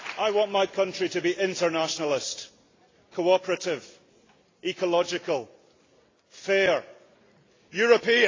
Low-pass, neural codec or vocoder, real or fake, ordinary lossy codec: 7.2 kHz; none; real; AAC, 32 kbps